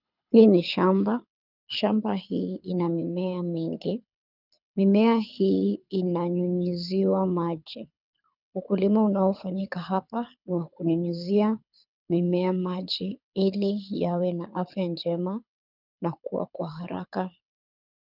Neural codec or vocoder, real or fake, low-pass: codec, 24 kHz, 6 kbps, HILCodec; fake; 5.4 kHz